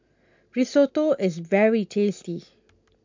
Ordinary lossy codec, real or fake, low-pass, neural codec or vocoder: MP3, 64 kbps; real; 7.2 kHz; none